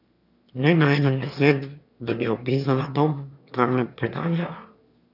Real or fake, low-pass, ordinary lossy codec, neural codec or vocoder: fake; 5.4 kHz; none; autoencoder, 22.05 kHz, a latent of 192 numbers a frame, VITS, trained on one speaker